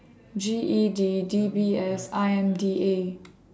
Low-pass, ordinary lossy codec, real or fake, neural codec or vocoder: none; none; real; none